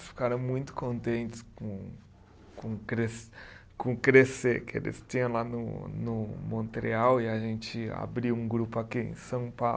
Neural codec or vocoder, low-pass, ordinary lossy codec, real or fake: none; none; none; real